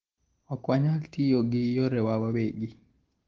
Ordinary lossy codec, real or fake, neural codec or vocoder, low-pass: Opus, 16 kbps; real; none; 7.2 kHz